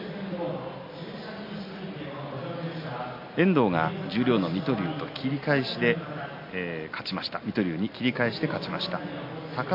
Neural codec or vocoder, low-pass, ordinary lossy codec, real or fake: none; 5.4 kHz; MP3, 32 kbps; real